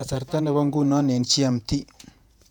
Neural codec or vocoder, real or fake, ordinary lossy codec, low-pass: vocoder, 48 kHz, 128 mel bands, Vocos; fake; none; 19.8 kHz